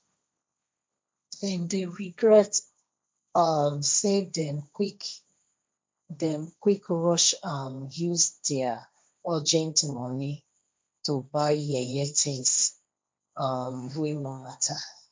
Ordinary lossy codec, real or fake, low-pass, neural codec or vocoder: none; fake; none; codec, 16 kHz, 1.1 kbps, Voila-Tokenizer